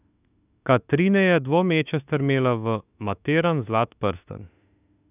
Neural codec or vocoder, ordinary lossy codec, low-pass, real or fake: autoencoder, 48 kHz, 32 numbers a frame, DAC-VAE, trained on Japanese speech; none; 3.6 kHz; fake